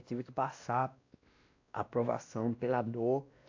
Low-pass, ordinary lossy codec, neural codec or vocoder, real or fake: 7.2 kHz; AAC, 48 kbps; codec, 16 kHz, 1 kbps, X-Codec, WavLM features, trained on Multilingual LibriSpeech; fake